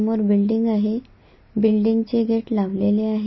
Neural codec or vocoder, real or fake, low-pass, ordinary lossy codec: none; real; 7.2 kHz; MP3, 24 kbps